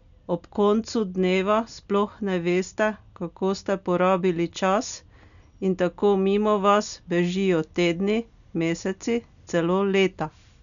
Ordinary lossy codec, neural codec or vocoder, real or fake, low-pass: none; none; real; 7.2 kHz